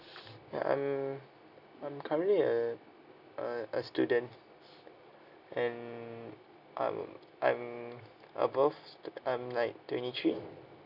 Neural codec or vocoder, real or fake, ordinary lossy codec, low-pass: none; real; none; 5.4 kHz